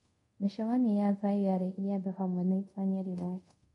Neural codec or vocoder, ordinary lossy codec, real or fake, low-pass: codec, 24 kHz, 0.5 kbps, DualCodec; MP3, 48 kbps; fake; 10.8 kHz